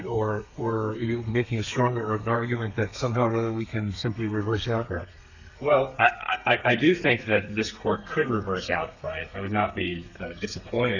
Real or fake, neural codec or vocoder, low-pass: fake; codec, 32 kHz, 1.9 kbps, SNAC; 7.2 kHz